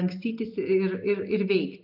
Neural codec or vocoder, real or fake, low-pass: none; real; 5.4 kHz